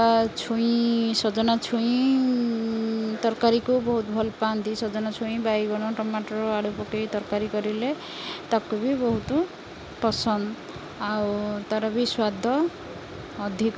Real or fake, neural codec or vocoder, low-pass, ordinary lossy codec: real; none; none; none